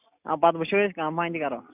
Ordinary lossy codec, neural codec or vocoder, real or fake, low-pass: none; none; real; 3.6 kHz